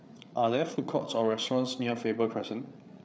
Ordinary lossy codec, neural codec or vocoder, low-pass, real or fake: none; codec, 16 kHz, 8 kbps, FreqCodec, larger model; none; fake